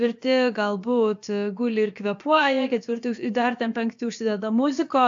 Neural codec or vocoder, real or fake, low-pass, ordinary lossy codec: codec, 16 kHz, about 1 kbps, DyCAST, with the encoder's durations; fake; 7.2 kHz; AAC, 64 kbps